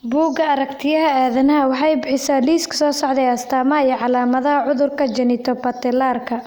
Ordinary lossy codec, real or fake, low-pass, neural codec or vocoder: none; real; none; none